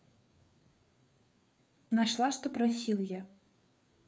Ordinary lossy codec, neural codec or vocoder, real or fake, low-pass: none; codec, 16 kHz, 4 kbps, FreqCodec, larger model; fake; none